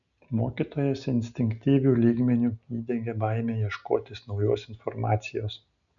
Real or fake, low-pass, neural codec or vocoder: real; 7.2 kHz; none